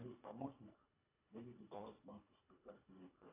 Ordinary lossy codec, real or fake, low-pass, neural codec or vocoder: AAC, 32 kbps; fake; 3.6 kHz; codec, 24 kHz, 1.5 kbps, HILCodec